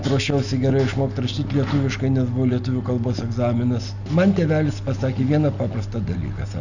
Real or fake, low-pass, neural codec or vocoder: real; 7.2 kHz; none